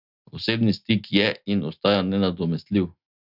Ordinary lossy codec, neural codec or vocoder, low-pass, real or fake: none; none; 5.4 kHz; real